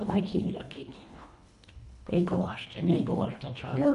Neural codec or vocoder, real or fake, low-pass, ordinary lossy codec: codec, 24 kHz, 1.5 kbps, HILCodec; fake; 10.8 kHz; none